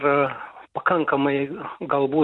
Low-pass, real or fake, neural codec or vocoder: 10.8 kHz; real; none